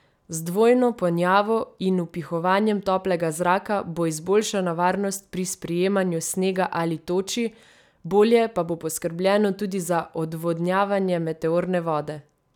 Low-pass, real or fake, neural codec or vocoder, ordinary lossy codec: 19.8 kHz; real; none; none